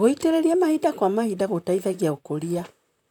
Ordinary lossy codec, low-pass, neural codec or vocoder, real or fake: none; 19.8 kHz; vocoder, 44.1 kHz, 128 mel bands, Pupu-Vocoder; fake